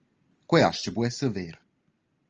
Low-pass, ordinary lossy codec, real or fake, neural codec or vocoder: 7.2 kHz; Opus, 24 kbps; real; none